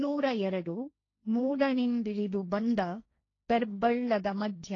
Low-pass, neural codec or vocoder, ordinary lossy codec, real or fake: 7.2 kHz; codec, 16 kHz, 1.1 kbps, Voila-Tokenizer; AAC, 32 kbps; fake